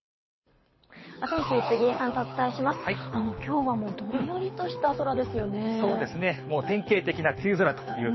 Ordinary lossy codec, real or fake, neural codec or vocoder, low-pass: MP3, 24 kbps; fake; codec, 24 kHz, 6 kbps, HILCodec; 7.2 kHz